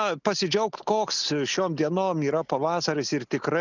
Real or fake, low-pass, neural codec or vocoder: real; 7.2 kHz; none